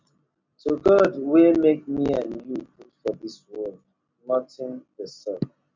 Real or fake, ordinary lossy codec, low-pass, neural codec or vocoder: real; MP3, 64 kbps; 7.2 kHz; none